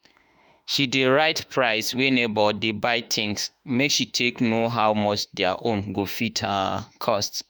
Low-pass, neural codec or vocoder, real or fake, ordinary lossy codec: none; autoencoder, 48 kHz, 32 numbers a frame, DAC-VAE, trained on Japanese speech; fake; none